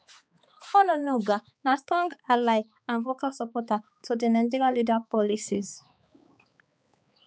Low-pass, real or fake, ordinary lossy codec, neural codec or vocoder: none; fake; none; codec, 16 kHz, 4 kbps, X-Codec, HuBERT features, trained on balanced general audio